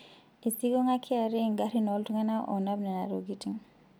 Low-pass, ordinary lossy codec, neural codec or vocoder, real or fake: none; none; none; real